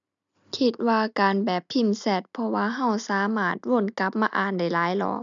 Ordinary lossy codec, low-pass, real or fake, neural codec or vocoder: none; 7.2 kHz; real; none